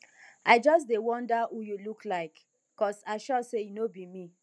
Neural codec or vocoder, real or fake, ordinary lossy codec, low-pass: none; real; none; none